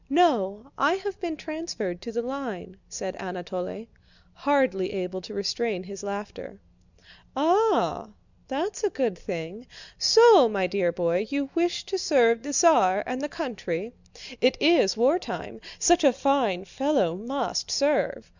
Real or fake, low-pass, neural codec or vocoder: real; 7.2 kHz; none